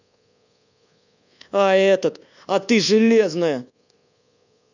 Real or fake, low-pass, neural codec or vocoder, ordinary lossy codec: fake; 7.2 kHz; codec, 24 kHz, 1.2 kbps, DualCodec; none